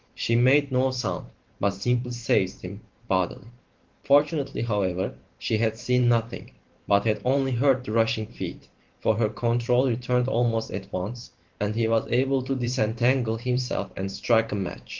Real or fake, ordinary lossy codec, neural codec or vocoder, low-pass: fake; Opus, 16 kbps; vocoder, 44.1 kHz, 128 mel bands every 512 samples, BigVGAN v2; 7.2 kHz